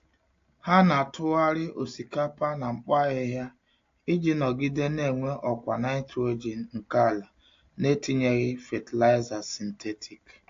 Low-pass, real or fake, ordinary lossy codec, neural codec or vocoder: 7.2 kHz; real; AAC, 48 kbps; none